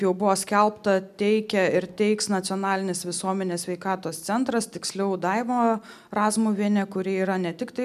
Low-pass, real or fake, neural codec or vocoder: 14.4 kHz; real; none